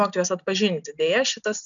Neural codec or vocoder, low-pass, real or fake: none; 7.2 kHz; real